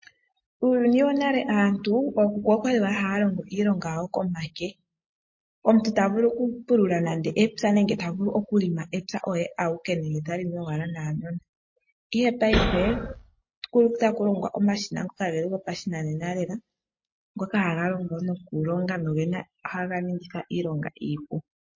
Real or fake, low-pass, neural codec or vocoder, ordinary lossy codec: real; 7.2 kHz; none; MP3, 32 kbps